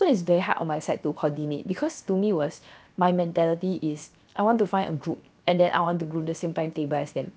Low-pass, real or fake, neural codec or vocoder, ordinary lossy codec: none; fake; codec, 16 kHz, 0.7 kbps, FocalCodec; none